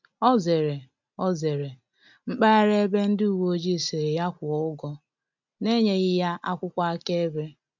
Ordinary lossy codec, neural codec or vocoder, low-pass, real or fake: MP3, 64 kbps; none; 7.2 kHz; real